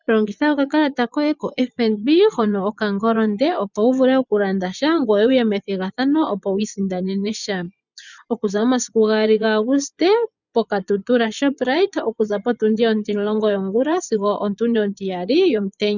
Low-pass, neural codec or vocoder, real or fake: 7.2 kHz; vocoder, 24 kHz, 100 mel bands, Vocos; fake